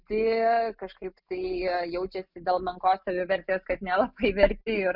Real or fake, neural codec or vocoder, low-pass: real; none; 5.4 kHz